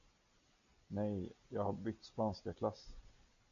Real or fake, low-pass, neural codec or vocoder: real; 7.2 kHz; none